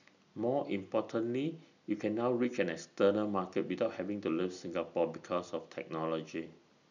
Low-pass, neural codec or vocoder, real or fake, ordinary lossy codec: 7.2 kHz; none; real; none